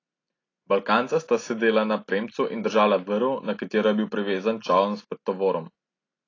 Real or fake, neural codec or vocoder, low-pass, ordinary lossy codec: real; none; 7.2 kHz; AAC, 32 kbps